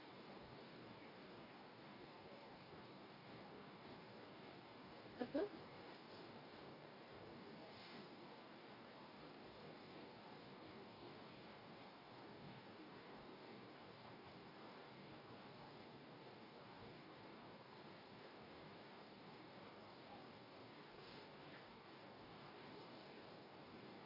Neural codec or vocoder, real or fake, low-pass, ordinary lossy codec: codec, 44.1 kHz, 2.6 kbps, DAC; fake; 5.4 kHz; Opus, 64 kbps